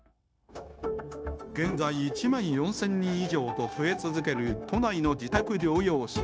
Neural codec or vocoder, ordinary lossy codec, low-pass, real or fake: codec, 16 kHz, 0.9 kbps, LongCat-Audio-Codec; none; none; fake